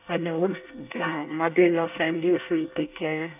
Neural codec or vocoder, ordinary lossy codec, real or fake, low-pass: codec, 24 kHz, 1 kbps, SNAC; none; fake; 3.6 kHz